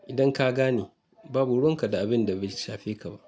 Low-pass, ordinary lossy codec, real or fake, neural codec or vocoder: none; none; real; none